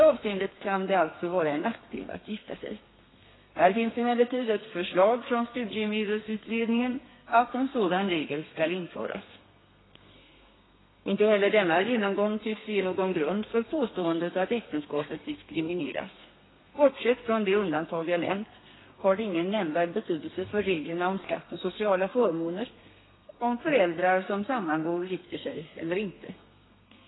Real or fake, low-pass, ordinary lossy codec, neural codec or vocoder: fake; 7.2 kHz; AAC, 16 kbps; codec, 32 kHz, 1.9 kbps, SNAC